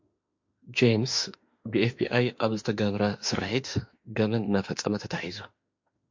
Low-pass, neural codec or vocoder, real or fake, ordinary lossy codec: 7.2 kHz; autoencoder, 48 kHz, 32 numbers a frame, DAC-VAE, trained on Japanese speech; fake; MP3, 48 kbps